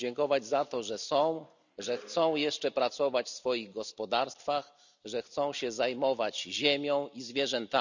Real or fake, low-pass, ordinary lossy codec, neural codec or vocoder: real; 7.2 kHz; none; none